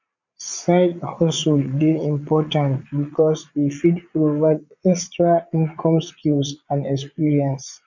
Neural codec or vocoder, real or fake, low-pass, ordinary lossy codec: vocoder, 22.05 kHz, 80 mel bands, Vocos; fake; 7.2 kHz; none